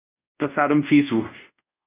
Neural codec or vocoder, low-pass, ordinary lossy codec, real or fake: codec, 24 kHz, 0.5 kbps, DualCodec; 3.6 kHz; Opus, 64 kbps; fake